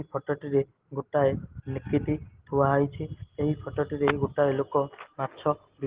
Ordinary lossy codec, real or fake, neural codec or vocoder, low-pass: Opus, 16 kbps; real; none; 3.6 kHz